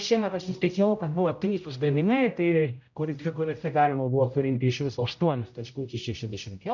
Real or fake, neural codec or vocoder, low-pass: fake; codec, 16 kHz, 0.5 kbps, X-Codec, HuBERT features, trained on general audio; 7.2 kHz